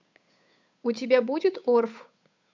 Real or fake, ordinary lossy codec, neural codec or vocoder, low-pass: fake; MP3, 64 kbps; codec, 16 kHz, 8 kbps, FunCodec, trained on Chinese and English, 25 frames a second; 7.2 kHz